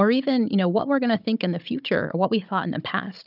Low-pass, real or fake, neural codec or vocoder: 5.4 kHz; fake; codec, 16 kHz, 16 kbps, FunCodec, trained on Chinese and English, 50 frames a second